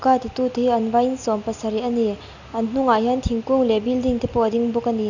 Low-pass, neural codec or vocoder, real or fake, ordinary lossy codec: 7.2 kHz; none; real; none